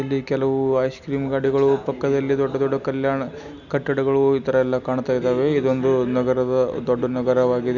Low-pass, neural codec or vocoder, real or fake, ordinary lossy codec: 7.2 kHz; none; real; none